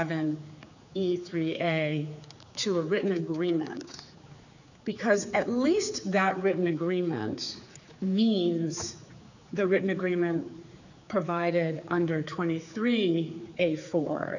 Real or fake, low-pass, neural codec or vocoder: fake; 7.2 kHz; codec, 16 kHz, 4 kbps, X-Codec, HuBERT features, trained on general audio